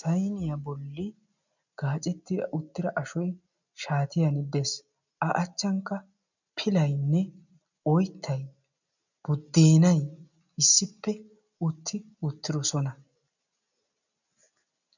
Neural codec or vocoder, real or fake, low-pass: none; real; 7.2 kHz